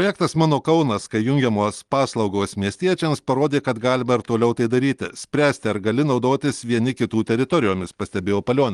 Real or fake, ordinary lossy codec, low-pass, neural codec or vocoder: real; Opus, 24 kbps; 10.8 kHz; none